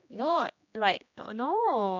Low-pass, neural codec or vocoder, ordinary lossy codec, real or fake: 7.2 kHz; codec, 16 kHz, 1 kbps, X-Codec, HuBERT features, trained on general audio; none; fake